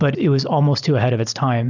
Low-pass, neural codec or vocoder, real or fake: 7.2 kHz; none; real